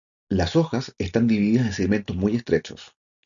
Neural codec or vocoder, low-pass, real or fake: none; 7.2 kHz; real